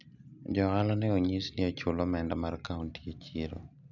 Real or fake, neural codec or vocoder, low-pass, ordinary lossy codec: real; none; 7.2 kHz; none